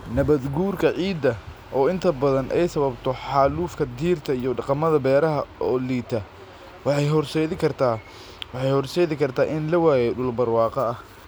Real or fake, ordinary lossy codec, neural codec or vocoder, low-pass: real; none; none; none